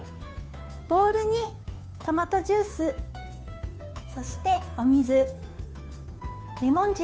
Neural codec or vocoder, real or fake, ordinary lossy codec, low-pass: codec, 16 kHz, 2 kbps, FunCodec, trained on Chinese and English, 25 frames a second; fake; none; none